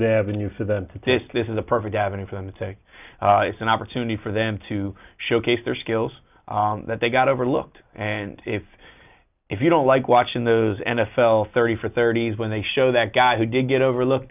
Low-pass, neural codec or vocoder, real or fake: 3.6 kHz; none; real